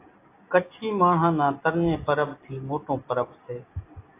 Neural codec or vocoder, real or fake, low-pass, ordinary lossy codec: none; real; 3.6 kHz; AAC, 24 kbps